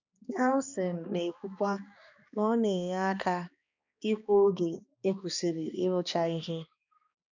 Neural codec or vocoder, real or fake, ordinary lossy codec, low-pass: codec, 16 kHz, 2 kbps, X-Codec, HuBERT features, trained on balanced general audio; fake; none; 7.2 kHz